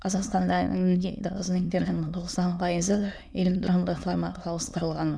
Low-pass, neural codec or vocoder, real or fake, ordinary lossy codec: none; autoencoder, 22.05 kHz, a latent of 192 numbers a frame, VITS, trained on many speakers; fake; none